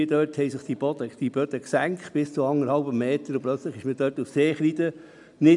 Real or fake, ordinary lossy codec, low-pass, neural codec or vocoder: real; none; 10.8 kHz; none